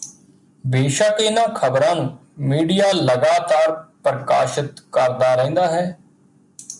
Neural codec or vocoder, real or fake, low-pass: none; real; 10.8 kHz